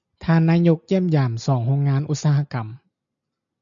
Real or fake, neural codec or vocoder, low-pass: real; none; 7.2 kHz